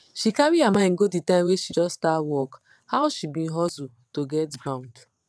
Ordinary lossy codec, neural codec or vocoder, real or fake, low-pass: none; vocoder, 22.05 kHz, 80 mel bands, WaveNeXt; fake; none